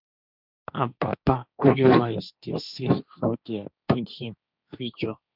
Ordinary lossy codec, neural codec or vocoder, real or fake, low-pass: none; codec, 16 kHz, 1.1 kbps, Voila-Tokenizer; fake; 5.4 kHz